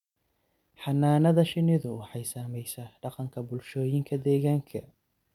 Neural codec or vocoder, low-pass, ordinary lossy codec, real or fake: none; 19.8 kHz; none; real